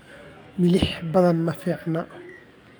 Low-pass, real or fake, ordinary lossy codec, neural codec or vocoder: none; fake; none; codec, 44.1 kHz, 7.8 kbps, DAC